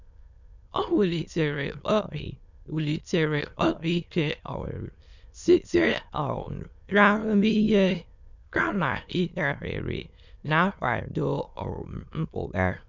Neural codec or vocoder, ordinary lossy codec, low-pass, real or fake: autoencoder, 22.05 kHz, a latent of 192 numbers a frame, VITS, trained on many speakers; none; 7.2 kHz; fake